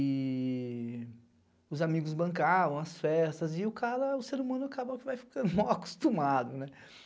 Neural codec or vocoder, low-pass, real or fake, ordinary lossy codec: none; none; real; none